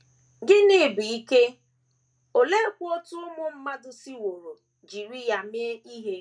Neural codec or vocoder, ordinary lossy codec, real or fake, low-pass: none; none; real; 9.9 kHz